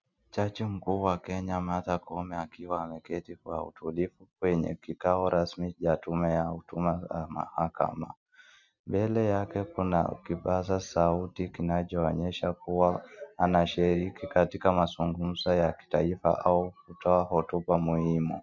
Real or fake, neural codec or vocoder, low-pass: real; none; 7.2 kHz